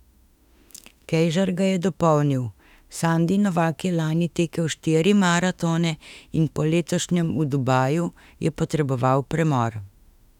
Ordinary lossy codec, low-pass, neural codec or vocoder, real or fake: none; 19.8 kHz; autoencoder, 48 kHz, 32 numbers a frame, DAC-VAE, trained on Japanese speech; fake